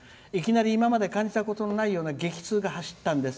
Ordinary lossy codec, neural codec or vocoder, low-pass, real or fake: none; none; none; real